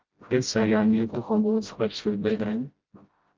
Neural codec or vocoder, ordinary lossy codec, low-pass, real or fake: codec, 16 kHz, 0.5 kbps, FreqCodec, smaller model; Opus, 32 kbps; 7.2 kHz; fake